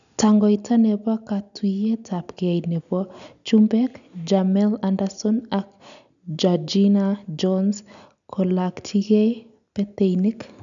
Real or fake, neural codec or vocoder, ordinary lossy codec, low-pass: real; none; none; 7.2 kHz